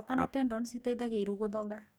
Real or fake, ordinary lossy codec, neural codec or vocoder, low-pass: fake; none; codec, 44.1 kHz, 2.6 kbps, DAC; none